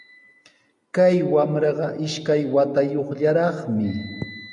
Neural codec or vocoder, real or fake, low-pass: none; real; 10.8 kHz